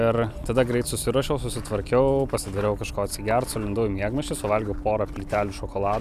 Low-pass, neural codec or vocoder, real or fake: 14.4 kHz; vocoder, 44.1 kHz, 128 mel bands every 256 samples, BigVGAN v2; fake